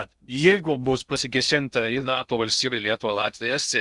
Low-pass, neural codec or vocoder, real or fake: 10.8 kHz; codec, 16 kHz in and 24 kHz out, 0.6 kbps, FocalCodec, streaming, 2048 codes; fake